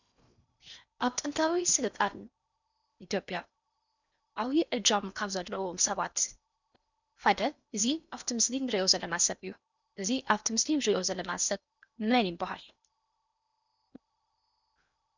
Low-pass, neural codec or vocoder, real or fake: 7.2 kHz; codec, 16 kHz in and 24 kHz out, 0.8 kbps, FocalCodec, streaming, 65536 codes; fake